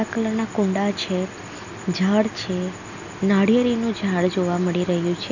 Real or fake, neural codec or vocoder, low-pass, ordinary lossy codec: real; none; 7.2 kHz; none